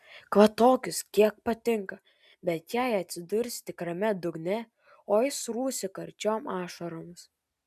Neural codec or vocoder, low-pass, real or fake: none; 14.4 kHz; real